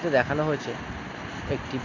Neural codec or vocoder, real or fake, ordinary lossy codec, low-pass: none; real; MP3, 64 kbps; 7.2 kHz